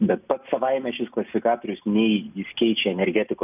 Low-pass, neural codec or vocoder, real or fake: 3.6 kHz; none; real